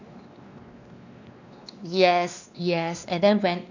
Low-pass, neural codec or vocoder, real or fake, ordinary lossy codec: 7.2 kHz; codec, 16 kHz, 2 kbps, X-Codec, WavLM features, trained on Multilingual LibriSpeech; fake; none